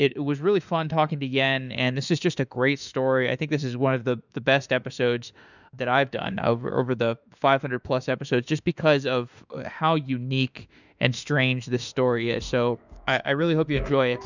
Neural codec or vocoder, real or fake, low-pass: autoencoder, 48 kHz, 32 numbers a frame, DAC-VAE, trained on Japanese speech; fake; 7.2 kHz